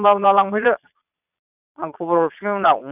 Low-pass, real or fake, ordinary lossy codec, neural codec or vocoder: 3.6 kHz; fake; none; vocoder, 22.05 kHz, 80 mel bands, Vocos